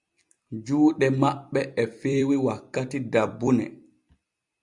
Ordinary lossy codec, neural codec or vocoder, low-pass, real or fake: Opus, 64 kbps; vocoder, 44.1 kHz, 128 mel bands every 256 samples, BigVGAN v2; 10.8 kHz; fake